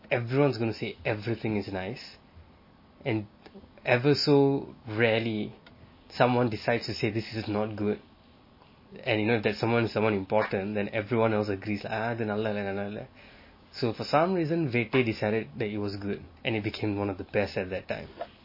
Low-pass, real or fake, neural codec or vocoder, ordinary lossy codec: 5.4 kHz; real; none; MP3, 24 kbps